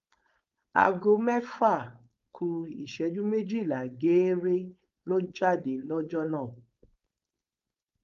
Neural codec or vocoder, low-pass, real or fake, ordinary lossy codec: codec, 16 kHz, 4.8 kbps, FACodec; 7.2 kHz; fake; Opus, 32 kbps